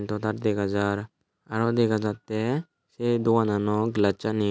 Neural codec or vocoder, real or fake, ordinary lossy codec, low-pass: none; real; none; none